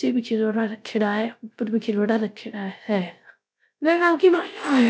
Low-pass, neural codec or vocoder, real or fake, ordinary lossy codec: none; codec, 16 kHz, 0.3 kbps, FocalCodec; fake; none